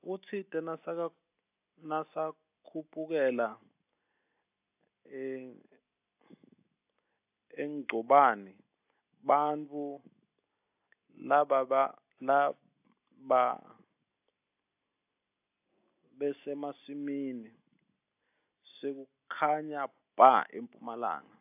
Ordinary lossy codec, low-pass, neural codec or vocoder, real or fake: AAC, 32 kbps; 3.6 kHz; none; real